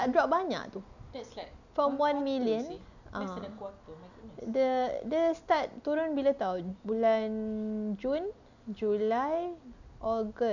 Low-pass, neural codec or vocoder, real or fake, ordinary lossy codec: 7.2 kHz; none; real; none